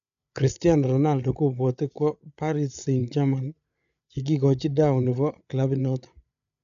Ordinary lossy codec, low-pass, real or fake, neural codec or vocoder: none; 7.2 kHz; fake; codec, 16 kHz, 8 kbps, FreqCodec, larger model